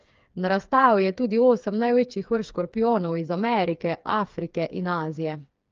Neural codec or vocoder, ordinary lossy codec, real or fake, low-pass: codec, 16 kHz, 4 kbps, FreqCodec, smaller model; Opus, 24 kbps; fake; 7.2 kHz